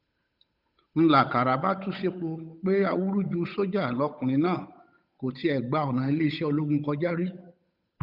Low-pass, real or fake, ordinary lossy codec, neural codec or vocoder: 5.4 kHz; fake; none; codec, 16 kHz, 8 kbps, FunCodec, trained on Chinese and English, 25 frames a second